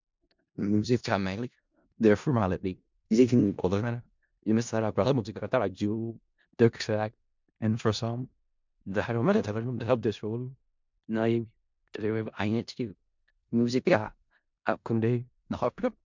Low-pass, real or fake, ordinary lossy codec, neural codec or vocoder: 7.2 kHz; fake; MP3, 64 kbps; codec, 16 kHz in and 24 kHz out, 0.4 kbps, LongCat-Audio-Codec, four codebook decoder